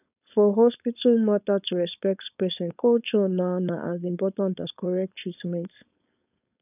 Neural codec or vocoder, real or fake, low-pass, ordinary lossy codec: codec, 16 kHz, 4.8 kbps, FACodec; fake; 3.6 kHz; none